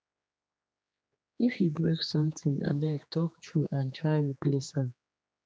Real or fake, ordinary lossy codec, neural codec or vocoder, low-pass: fake; none; codec, 16 kHz, 2 kbps, X-Codec, HuBERT features, trained on general audio; none